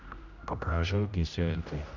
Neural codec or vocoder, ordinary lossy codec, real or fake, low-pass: codec, 16 kHz, 0.5 kbps, X-Codec, HuBERT features, trained on general audio; none; fake; 7.2 kHz